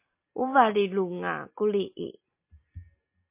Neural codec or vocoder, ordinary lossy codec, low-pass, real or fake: none; MP3, 24 kbps; 3.6 kHz; real